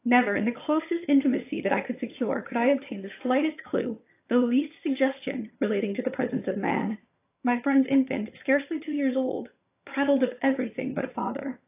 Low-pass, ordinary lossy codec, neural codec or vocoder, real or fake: 3.6 kHz; AAC, 24 kbps; vocoder, 22.05 kHz, 80 mel bands, HiFi-GAN; fake